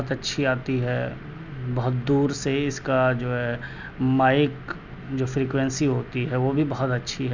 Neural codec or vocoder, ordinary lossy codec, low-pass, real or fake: none; none; 7.2 kHz; real